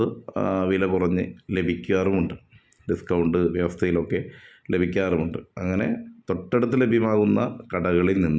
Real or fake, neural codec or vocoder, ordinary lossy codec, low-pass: real; none; none; none